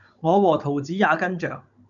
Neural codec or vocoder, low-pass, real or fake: codec, 16 kHz, 16 kbps, FunCodec, trained on Chinese and English, 50 frames a second; 7.2 kHz; fake